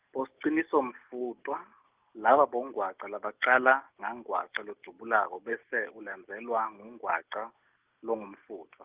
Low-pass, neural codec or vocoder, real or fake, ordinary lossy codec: 3.6 kHz; none; real; Opus, 32 kbps